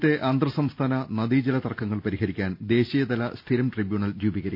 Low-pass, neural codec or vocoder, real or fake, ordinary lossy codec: 5.4 kHz; none; real; none